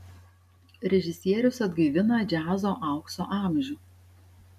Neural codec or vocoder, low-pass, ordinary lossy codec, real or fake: none; 14.4 kHz; AAC, 96 kbps; real